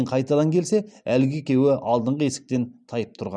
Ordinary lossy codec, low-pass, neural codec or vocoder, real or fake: none; 9.9 kHz; none; real